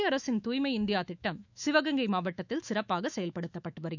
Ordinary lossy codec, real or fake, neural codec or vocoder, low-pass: none; fake; autoencoder, 48 kHz, 128 numbers a frame, DAC-VAE, trained on Japanese speech; 7.2 kHz